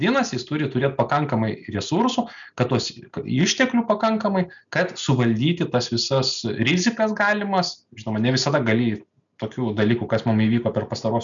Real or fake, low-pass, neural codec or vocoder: real; 7.2 kHz; none